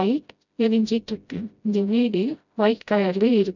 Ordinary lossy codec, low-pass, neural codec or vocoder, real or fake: none; 7.2 kHz; codec, 16 kHz, 0.5 kbps, FreqCodec, smaller model; fake